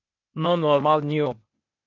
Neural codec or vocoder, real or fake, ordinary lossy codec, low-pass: codec, 16 kHz, 0.8 kbps, ZipCodec; fake; MP3, 64 kbps; 7.2 kHz